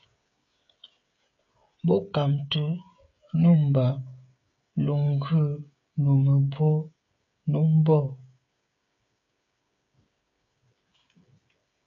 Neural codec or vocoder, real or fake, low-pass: codec, 16 kHz, 16 kbps, FreqCodec, smaller model; fake; 7.2 kHz